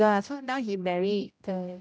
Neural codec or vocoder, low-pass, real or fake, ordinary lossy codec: codec, 16 kHz, 0.5 kbps, X-Codec, HuBERT features, trained on general audio; none; fake; none